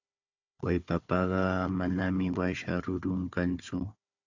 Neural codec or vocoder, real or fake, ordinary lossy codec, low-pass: codec, 16 kHz, 4 kbps, FunCodec, trained on Chinese and English, 50 frames a second; fake; AAC, 48 kbps; 7.2 kHz